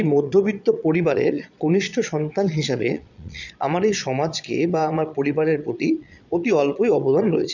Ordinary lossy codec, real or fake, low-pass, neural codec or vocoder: none; fake; 7.2 kHz; vocoder, 22.05 kHz, 80 mel bands, Vocos